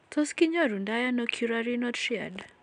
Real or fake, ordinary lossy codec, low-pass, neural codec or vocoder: real; none; 9.9 kHz; none